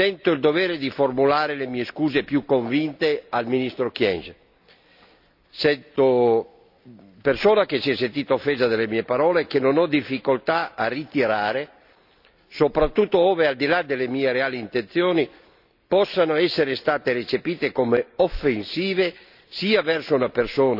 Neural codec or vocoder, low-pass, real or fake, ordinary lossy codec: none; 5.4 kHz; real; none